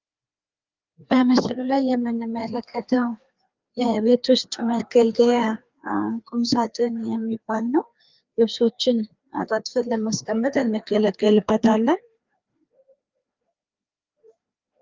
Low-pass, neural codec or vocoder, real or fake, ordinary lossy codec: 7.2 kHz; codec, 16 kHz, 2 kbps, FreqCodec, larger model; fake; Opus, 32 kbps